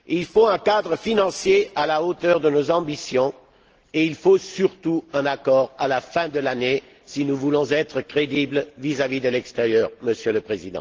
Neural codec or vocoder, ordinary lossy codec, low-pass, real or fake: none; Opus, 16 kbps; 7.2 kHz; real